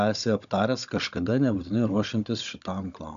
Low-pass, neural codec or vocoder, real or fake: 7.2 kHz; codec, 16 kHz, 16 kbps, FunCodec, trained on Chinese and English, 50 frames a second; fake